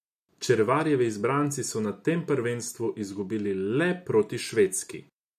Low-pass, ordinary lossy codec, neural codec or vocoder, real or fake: 14.4 kHz; AAC, 96 kbps; none; real